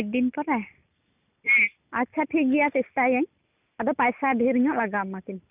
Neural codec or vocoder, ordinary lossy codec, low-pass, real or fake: none; none; 3.6 kHz; real